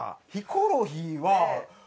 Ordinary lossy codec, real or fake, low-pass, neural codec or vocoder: none; real; none; none